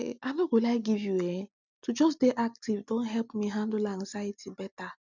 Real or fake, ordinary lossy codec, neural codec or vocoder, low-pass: real; none; none; 7.2 kHz